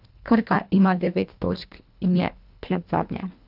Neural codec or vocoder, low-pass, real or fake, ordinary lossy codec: codec, 24 kHz, 1.5 kbps, HILCodec; 5.4 kHz; fake; none